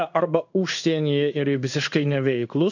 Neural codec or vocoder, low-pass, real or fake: codec, 16 kHz in and 24 kHz out, 1 kbps, XY-Tokenizer; 7.2 kHz; fake